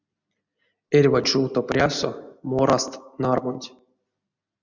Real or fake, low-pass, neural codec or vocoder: real; 7.2 kHz; none